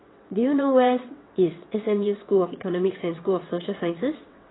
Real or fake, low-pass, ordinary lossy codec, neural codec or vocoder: fake; 7.2 kHz; AAC, 16 kbps; vocoder, 22.05 kHz, 80 mel bands, WaveNeXt